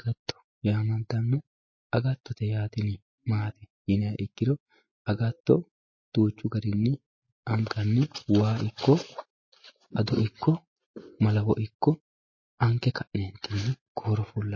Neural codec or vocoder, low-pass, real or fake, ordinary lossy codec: none; 7.2 kHz; real; MP3, 32 kbps